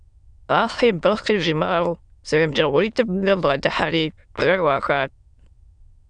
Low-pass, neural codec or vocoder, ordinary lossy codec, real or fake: 9.9 kHz; autoencoder, 22.05 kHz, a latent of 192 numbers a frame, VITS, trained on many speakers; Opus, 64 kbps; fake